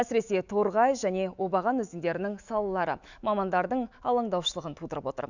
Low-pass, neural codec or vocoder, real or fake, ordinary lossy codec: 7.2 kHz; autoencoder, 48 kHz, 128 numbers a frame, DAC-VAE, trained on Japanese speech; fake; Opus, 64 kbps